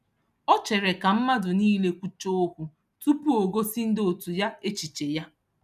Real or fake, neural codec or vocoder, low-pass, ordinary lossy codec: real; none; 14.4 kHz; none